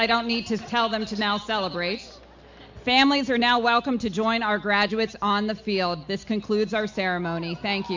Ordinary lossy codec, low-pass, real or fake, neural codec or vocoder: MP3, 48 kbps; 7.2 kHz; real; none